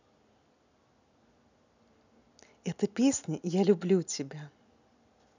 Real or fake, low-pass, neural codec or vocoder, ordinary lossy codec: real; 7.2 kHz; none; none